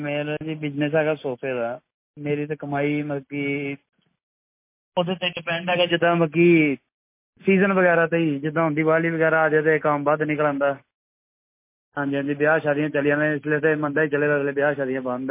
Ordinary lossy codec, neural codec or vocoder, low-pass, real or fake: MP3, 24 kbps; none; 3.6 kHz; real